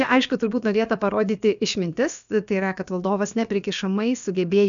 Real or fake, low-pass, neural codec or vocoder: fake; 7.2 kHz; codec, 16 kHz, about 1 kbps, DyCAST, with the encoder's durations